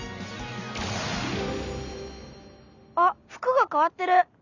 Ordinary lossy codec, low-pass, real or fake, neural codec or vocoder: none; 7.2 kHz; real; none